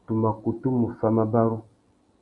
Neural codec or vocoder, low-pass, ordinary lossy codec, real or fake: none; 10.8 kHz; AAC, 64 kbps; real